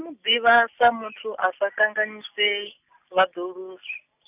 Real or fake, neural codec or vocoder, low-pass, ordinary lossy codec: real; none; 3.6 kHz; none